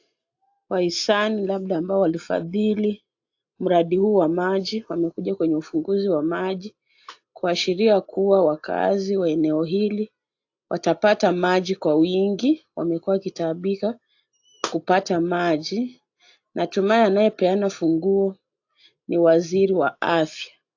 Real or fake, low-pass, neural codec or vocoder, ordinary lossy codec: real; 7.2 kHz; none; AAC, 48 kbps